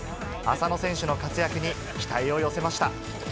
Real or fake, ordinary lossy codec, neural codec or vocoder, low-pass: real; none; none; none